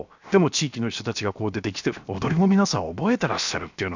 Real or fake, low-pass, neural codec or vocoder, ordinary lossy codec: fake; 7.2 kHz; codec, 16 kHz, 0.7 kbps, FocalCodec; none